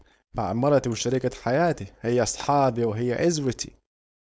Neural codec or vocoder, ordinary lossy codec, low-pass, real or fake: codec, 16 kHz, 4.8 kbps, FACodec; none; none; fake